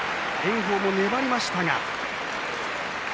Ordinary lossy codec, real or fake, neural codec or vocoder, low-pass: none; real; none; none